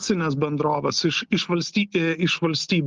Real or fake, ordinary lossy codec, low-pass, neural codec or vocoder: real; Opus, 32 kbps; 7.2 kHz; none